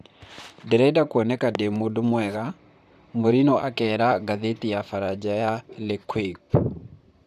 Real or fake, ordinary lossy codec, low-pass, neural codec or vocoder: fake; none; 14.4 kHz; vocoder, 44.1 kHz, 128 mel bands, Pupu-Vocoder